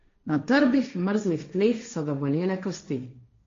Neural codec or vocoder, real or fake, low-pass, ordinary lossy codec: codec, 16 kHz, 1.1 kbps, Voila-Tokenizer; fake; 7.2 kHz; MP3, 48 kbps